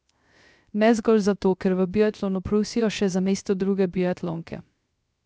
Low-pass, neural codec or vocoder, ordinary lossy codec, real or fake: none; codec, 16 kHz, 0.3 kbps, FocalCodec; none; fake